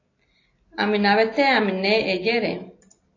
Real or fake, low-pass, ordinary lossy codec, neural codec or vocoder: real; 7.2 kHz; AAC, 32 kbps; none